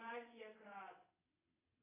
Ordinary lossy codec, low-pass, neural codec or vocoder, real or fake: AAC, 24 kbps; 3.6 kHz; vocoder, 22.05 kHz, 80 mel bands, WaveNeXt; fake